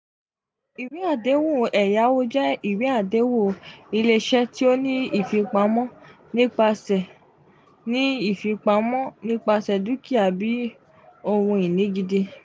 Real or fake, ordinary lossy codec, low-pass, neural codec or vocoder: real; none; none; none